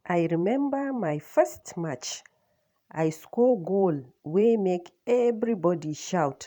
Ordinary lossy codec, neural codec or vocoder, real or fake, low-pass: none; vocoder, 44.1 kHz, 128 mel bands every 512 samples, BigVGAN v2; fake; 19.8 kHz